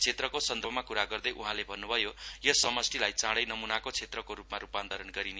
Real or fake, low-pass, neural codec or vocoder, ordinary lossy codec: real; none; none; none